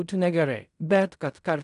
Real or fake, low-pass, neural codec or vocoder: fake; 10.8 kHz; codec, 16 kHz in and 24 kHz out, 0.4 kbps, LongCat-Audio-Codec, fine tuned four codebook decoder